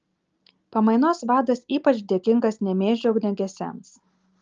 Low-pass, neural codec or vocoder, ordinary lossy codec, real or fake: 7.2 kHz; none; Opus, 24 kbps; real